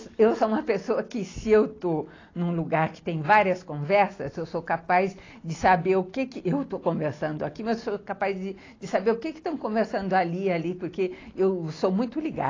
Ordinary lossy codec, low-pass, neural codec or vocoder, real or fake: AAC, 32 kbps; 7.2 kHz; none; real